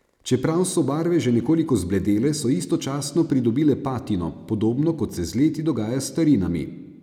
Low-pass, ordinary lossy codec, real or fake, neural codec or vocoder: 19.8 kHz; none; real; none